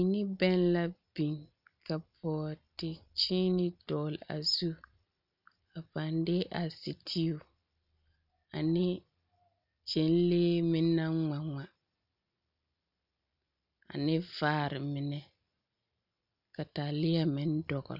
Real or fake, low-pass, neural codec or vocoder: real; 5.4 kHz; none